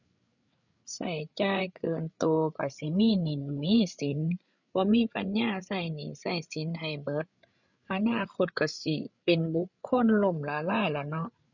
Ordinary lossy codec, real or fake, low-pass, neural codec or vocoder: none; fake; 7.2 kHz; codec, 16 kHz, 8 kbps, FreqCodec, larger model